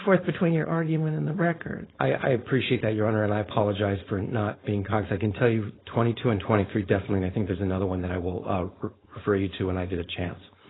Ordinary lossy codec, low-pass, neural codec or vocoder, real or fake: AAC, 16 kbps; 7.2 kHz; codec, 16 kHz, 4.8 kbps, FACodec; fake